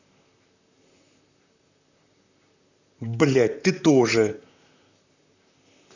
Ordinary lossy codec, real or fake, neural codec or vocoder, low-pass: none; fake; vocoder, 44.1 kHz, 128 mel bands, Pupu-Vocoder; 7.2 kHz